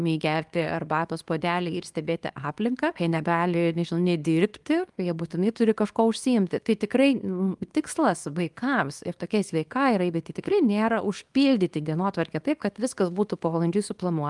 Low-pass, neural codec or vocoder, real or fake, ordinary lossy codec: 10.8 kHz; codec, 24 kHz, 0.9 kbps, WavTokenizer, small release; fake; Opus, 32 kbps